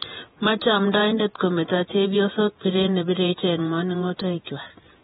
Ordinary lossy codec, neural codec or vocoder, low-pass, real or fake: AAC, 16 kbps; vocoder, 48 kHz, 128 mel bands, Vocos; 19.8 kHz; fake